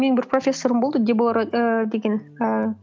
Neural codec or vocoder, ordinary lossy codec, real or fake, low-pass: none; none; real; none